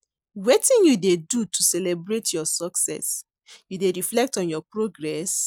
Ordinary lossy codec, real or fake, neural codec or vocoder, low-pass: none; real; none; none